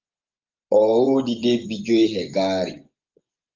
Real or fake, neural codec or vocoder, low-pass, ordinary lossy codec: fake; vocoder, 24 kHz, 100 mel bands, Vocos; 7.2 kHz; Opus, 32 kbps